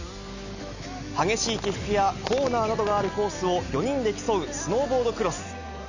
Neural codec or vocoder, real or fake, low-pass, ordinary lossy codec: none; real; 7.2 kHz; none